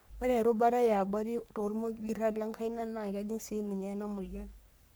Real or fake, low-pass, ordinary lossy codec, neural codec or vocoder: fake; none; none; codec, 44.1 kHz, 3.4 kbps, Pupu-Codec